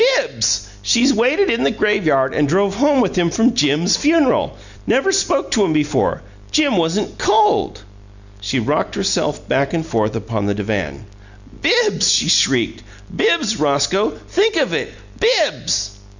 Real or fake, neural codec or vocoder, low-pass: real; none; 7.2 kHz